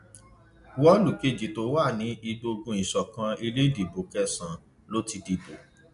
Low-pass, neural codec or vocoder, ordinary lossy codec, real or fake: 10.8 kHz; none; none; real